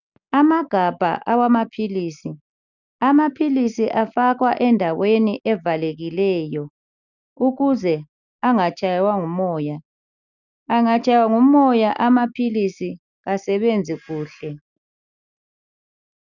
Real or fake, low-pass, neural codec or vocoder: real; 7.2 kHz; none